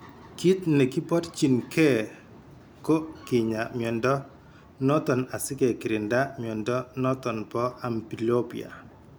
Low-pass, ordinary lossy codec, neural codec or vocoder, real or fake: none; none; none; real